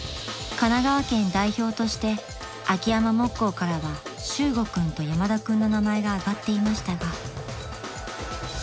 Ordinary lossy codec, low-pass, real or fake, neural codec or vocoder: none; none; real; none